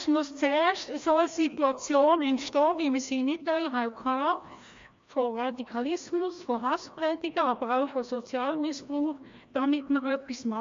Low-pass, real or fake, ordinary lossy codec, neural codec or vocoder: 7.2 kHz; fake; MP3, 48 kbps; codec, 16 kHz, 1 kbps, FreqCodec, larger model